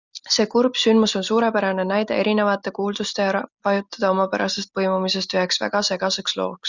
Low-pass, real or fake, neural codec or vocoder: 7.2 kHz; real; none